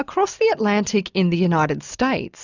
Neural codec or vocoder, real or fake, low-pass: none; real; 7.2 kHz